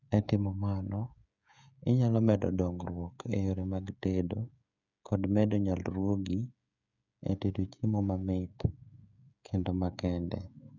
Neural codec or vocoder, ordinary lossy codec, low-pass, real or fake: codec, 16 kHz, 16 kbps, FreqCodec, smaller model; none; 7.2 kHz; fake